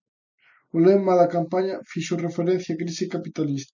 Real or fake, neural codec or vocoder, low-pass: real; none; 7.2 kHz